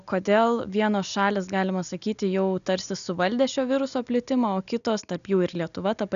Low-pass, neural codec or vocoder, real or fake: 7.2 kHz; none; real